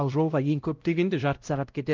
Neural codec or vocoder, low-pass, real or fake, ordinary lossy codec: codec, 16 kHz, 0.5 kbps, X-Codec, WavLM features, trained on Multilingual LibriSpeech; 7.2 kHz; fake; Opus, 24 kbps